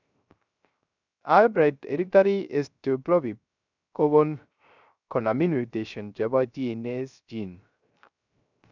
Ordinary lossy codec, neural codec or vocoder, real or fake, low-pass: none; codec, 16 kHz, 0.3 kbps, FocalCodec; fake; 7.2 kHz